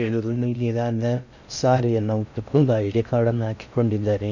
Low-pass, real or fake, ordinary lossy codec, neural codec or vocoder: 7.2 kHz; fake; none; codec, 16 kHz in and 24 kHz out, 0.6 kbps, FocalCodec, streaming, 2048 codes